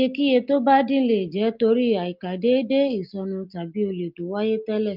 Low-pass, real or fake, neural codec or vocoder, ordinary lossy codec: 5.4 kHz; real; none; Opus, 24 kbps